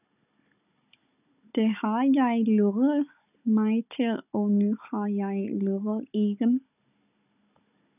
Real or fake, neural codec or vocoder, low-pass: fake; codec, 16 kHz, 16 kbps, FunCodec, trained on Chinese and English, 50 frames a second; 3.6 kHz